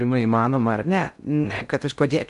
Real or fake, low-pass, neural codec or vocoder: fake; 10.8 kHz; codec, 16 kHz in and 24 kHz out, 0.6 kbps, FocalCodec, streaming, 2048 codes